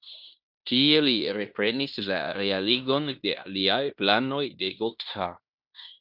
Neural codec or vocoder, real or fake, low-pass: codec, 16 kHz in and 24 kHz out, 0.9 kbps, LongCat-Audio-Codec, fine tuned four codebook decoder; fake; 5.4 kHz